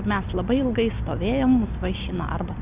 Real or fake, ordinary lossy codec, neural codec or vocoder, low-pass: real; Opus, 64 kbps; none; 3.6 kHz